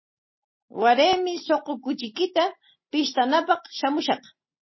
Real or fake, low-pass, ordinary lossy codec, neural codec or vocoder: real; 7.2 kHz; MP3, 24 kbps; none